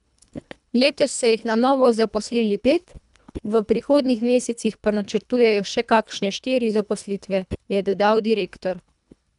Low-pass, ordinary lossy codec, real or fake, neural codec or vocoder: 10.8 kHz; none; fake; codec, 24 kHz, 1.5 kbps, HILCodec